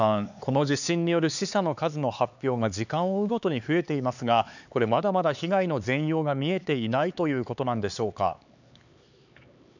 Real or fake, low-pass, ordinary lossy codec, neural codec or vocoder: fake; 7.2 kHz; none; codec, 16 kHz, 4 kbps, X-Codec, HuBERT features, trained on LibriSpeech